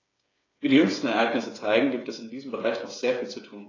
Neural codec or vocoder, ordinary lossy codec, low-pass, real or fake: codec, 16 kHz, 8 kbps, FreqCodec, smaller model; AAC, 32 kbps; 7.2 kHz; fake